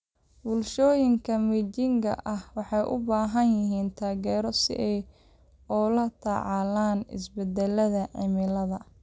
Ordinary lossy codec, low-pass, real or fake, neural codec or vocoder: none; none; real; none